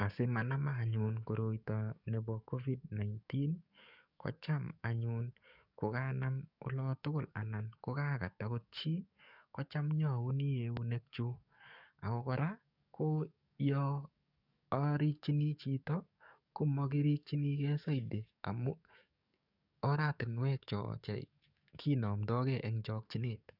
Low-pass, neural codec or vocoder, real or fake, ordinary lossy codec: 5.4 kHz; codec, 44.1 kHz, 7.8 kbps, DAC; fake; none